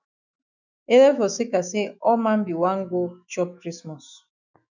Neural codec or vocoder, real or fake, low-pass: autoencoder, 48 kHz, 128 numbers a frame, DAC-VAE, trained on Japanese speech; fake; 7.2 kHz